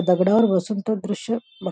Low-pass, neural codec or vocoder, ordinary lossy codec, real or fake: none; none; none; real